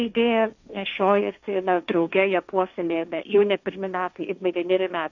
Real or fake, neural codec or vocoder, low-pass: fake; codec, 16 kHz, 1.1 kbps, Voila-Tokenizer; 7.2 kHz